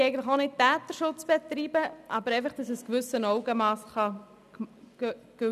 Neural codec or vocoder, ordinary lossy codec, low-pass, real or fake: none; none; 14.4 kHz; real